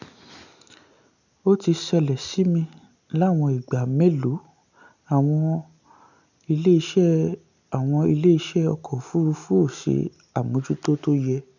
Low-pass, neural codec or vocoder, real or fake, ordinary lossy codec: 7.2 kHz; none; real; none